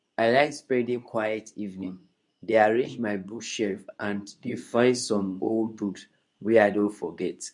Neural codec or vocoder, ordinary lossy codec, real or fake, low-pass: codec, 24 kHz, 0.9 kbps, WavTokenizer, medium speech release version 2; none; fake; 10.8 kHz